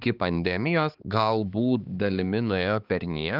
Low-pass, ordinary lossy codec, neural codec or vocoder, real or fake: 5.4 kHz; Opus, 32 kbps; codec, 16 kHz, 4 kbps, X-Codec, HuBERT features, trained on balanced general audio; fake